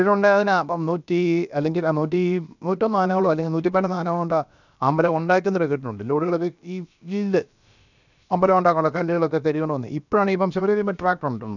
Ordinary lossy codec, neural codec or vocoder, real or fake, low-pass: none; codec, 16 kHz, about 1 kbps, DyCAST, with the encoder's durations; fake; 7.2 kHz